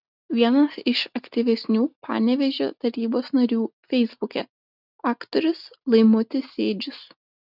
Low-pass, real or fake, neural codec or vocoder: 5.4 kHz; real; none